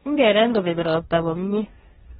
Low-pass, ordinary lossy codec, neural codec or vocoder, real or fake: 7.2 kHz; AAC, 16 kbps; codec, 16 kHz, 1.1 kbps, Voila-Tokenizer; fake